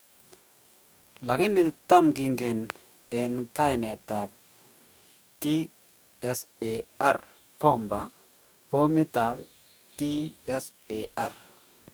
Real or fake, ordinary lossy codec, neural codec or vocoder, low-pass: fake; none; codec, 44.1 kHz, 2.6 kbps, DAC; none